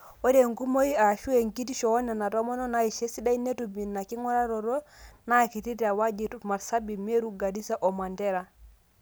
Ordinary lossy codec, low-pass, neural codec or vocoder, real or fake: none; none; none; real